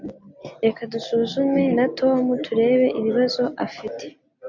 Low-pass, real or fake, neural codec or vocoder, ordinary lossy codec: 7.2 kHz; real; none; MP3, 48 kbps